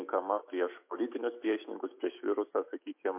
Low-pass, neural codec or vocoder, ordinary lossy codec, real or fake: 3.6 kHz; autoencoder, 48 kHz, 128 numbers a frame, DAC-VAE, trained on Japanese speech; MP3, 32 kbps; fake